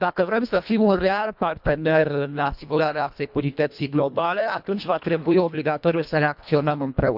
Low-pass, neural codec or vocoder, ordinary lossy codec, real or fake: 5.4 kHz; codec, 24 kHz, 1.5 kbps, HILCodec; none; fake